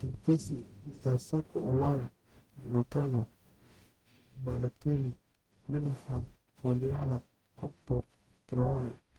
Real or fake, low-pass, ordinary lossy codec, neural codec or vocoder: fake; 19.8 kHz; Opus, 24 kbps; codec, 44.1 kHz, 0.9 kbps, DAC